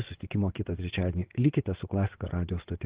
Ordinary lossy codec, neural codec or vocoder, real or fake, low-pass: Opus, 16 kbps; vocoder, 22.05 kHz, 80 mel bands, WaveNeXt; fake; 3.6 kHz